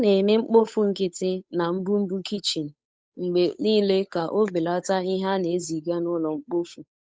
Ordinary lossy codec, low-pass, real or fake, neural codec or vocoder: none; none; fake; codec, 16 kHz, 8 kbps, FunCodec, trained on Chinese and English, 25 frames a second